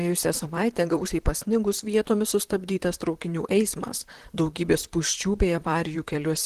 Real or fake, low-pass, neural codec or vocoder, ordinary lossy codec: fake; 14.4 kHz; vocoder, 44.1 kHz, 128 mel bands, Pupu-Vocoder; Opus, 16 kbps